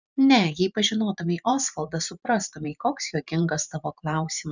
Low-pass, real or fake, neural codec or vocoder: 7.2 kHz; fake; vocoder, 44.1 kHz, 128 mel bands every 512 samples, BigVGAN v2